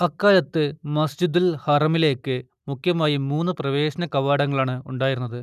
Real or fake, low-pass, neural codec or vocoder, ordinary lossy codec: real; 14.4 kHz; none; none